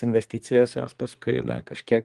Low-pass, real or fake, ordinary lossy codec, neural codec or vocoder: 10.8 kHz; fake; Opus, 24 kbps; codec, 24 kHz, 1 kbps, SNAC